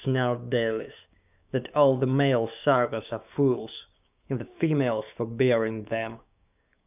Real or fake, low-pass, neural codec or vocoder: fake; 3.6 kHz; codec, 16 kHz, 6 kbps, DAC